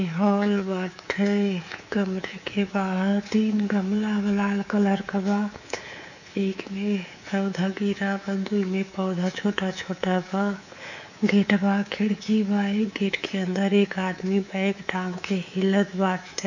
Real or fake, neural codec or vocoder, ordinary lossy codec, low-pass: fake; codec, 24 kHz, 3.1 kbps, DualCodec; none; 7.2 kHz